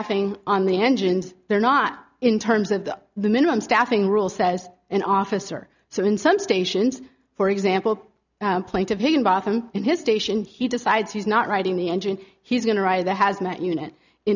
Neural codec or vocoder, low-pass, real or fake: none; 7.2 kHz; real